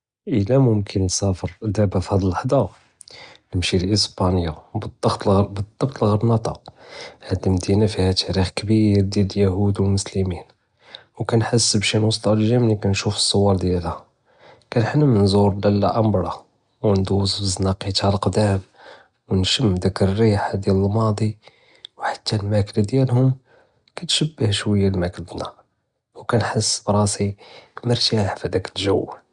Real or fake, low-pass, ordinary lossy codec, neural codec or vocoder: real; 9.9 kHz; Opus, 64 kbps; none